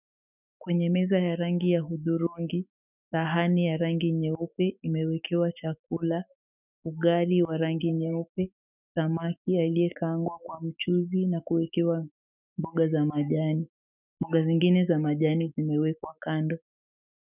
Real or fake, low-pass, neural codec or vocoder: fake; 3.6 kHz; autoencoder, 48 kHz, 128 numbers a frame, DAC-VAE, trained on Japanese speech